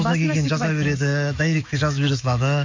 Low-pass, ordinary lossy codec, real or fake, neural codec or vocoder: 7.2 kHz; MP3, 48 kbps; fake; autoencoder, 48 kHz, 128 numbers a frame, DAC-VAE, trained on Japanese speech